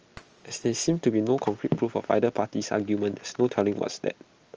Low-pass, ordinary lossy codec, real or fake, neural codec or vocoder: 7.2 kHz; Opus, 24 kbps; real; none